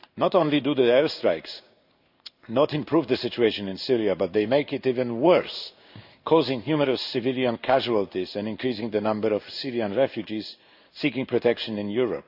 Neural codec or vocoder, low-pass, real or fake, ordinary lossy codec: codec, 16 kHz in and 24 kHz out, 1 kbps, XY-Tokenizer; 5.4 kHz; fake; AAC, 48 kbps